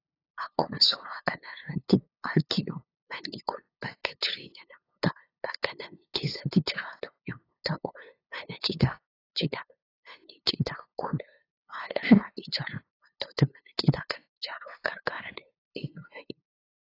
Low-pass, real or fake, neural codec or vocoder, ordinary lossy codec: 5.4 kHz; fake; codec, 16 kHz, 2 kbps, FunCodec, trained on LibriTTS, 25 frames a second; AAC, 24 kbps